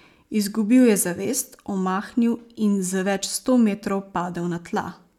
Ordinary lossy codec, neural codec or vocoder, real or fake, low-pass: none; none; real; 19.8 kHz